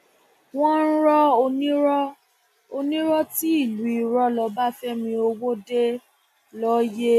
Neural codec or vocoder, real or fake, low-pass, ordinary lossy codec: none; real; 14.4 kHz; AAC, 96 kbps